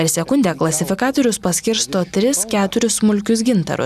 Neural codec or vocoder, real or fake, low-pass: none; real; 14.4 kHz